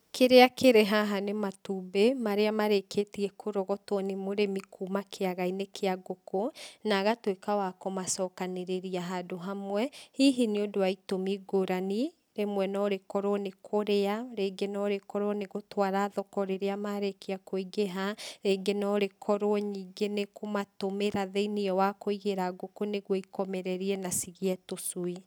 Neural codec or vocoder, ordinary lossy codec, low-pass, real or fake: none; none; none; real